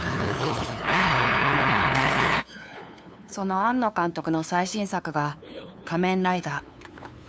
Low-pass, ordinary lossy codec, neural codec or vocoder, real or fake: none; none; codec, 16 kHz, 2 kbps, FunCodec, trained on LibriTTS, 25 frames a second; fake